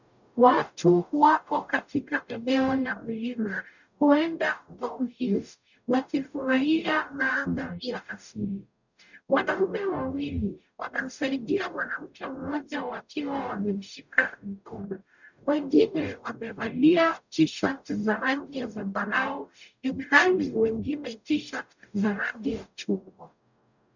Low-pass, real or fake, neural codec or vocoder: 7.2 kHz; fake; codec, 44.1 kHz, 0.9 kbps, DAC